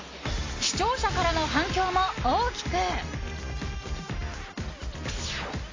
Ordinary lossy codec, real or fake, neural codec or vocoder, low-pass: AAC, 32 kbps; real; none; 7.2 kHz